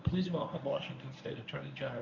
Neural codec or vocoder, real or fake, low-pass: codec, 24 kHz, 0.9 kbps, WavTokenizer, medium speech release version 1; fake; 7.2 kHz